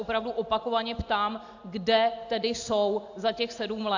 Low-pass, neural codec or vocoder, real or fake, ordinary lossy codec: 7.2 kHz; none; real; AAC, 48 kbps